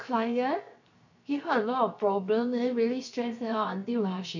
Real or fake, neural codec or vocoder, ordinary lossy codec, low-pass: fake; codec, 16 kHz, 0.7 kbps, FocalCodec; none; 7.2 kHz